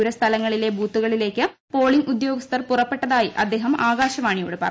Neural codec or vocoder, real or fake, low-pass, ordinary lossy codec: none; real; none; none